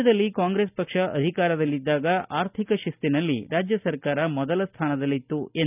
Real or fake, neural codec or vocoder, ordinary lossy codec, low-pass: real; none; none; 3.6 kHz